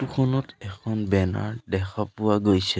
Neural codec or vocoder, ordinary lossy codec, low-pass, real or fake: none; none; none; real